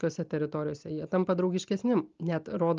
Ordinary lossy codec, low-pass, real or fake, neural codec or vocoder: Opus, 32 kbps; 7.2 kHz; real; none